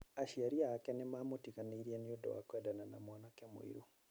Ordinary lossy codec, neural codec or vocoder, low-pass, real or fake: none; none; none; real